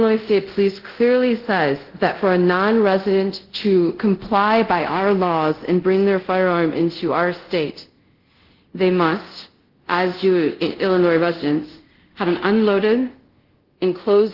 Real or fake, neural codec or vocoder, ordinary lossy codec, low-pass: fake; codec, 24 kHz, 0.5 kbps, DualCodec; Opus, 16 kbps; 5.4 kHz